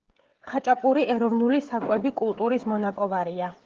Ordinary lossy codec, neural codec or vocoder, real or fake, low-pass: Opus, 16 kbps; codec, 16 kHz, 16 kbps, FreqCodec, smaller model; fake; 7.2 kHz